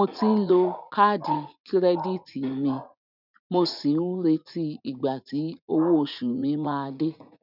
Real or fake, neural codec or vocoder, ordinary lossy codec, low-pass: fake; vocoder, 44.1 kHz, 80 mel bands, Vocos; none; 5.4 kHz